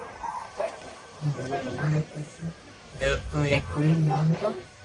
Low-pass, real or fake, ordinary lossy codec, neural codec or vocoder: 10.8 kHz; fake; AAC, 48 kbps; codec, 44.1 kHz, 1.7 kbps, Pupu-Codec